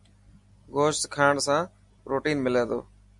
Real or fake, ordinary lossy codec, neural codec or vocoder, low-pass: real; MP3, 64 kbps; none; 10.8 kHz